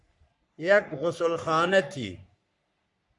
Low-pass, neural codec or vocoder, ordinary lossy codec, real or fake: 10.8 kHz; codec, 44.1 kHz, 3.4 kbps, Pupu-Codec; MP3, 96 kbps; fake